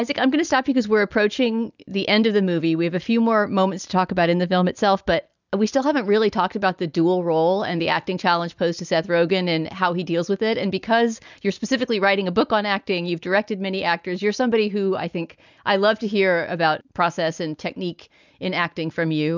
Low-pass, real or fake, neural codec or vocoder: 7.2 kHz; real; none